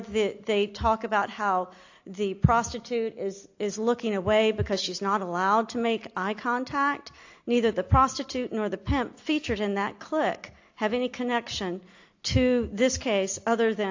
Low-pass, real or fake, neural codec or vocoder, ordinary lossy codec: 7.2 kHz; real; none; AAC, 48 kbps